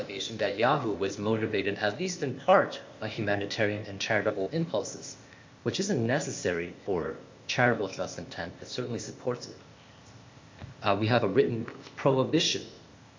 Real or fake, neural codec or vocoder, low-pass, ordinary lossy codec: fake; codec, 16 kHz, 0.8 kbps, ZipCodec; 7.2 kHz; MP3, 64 kbps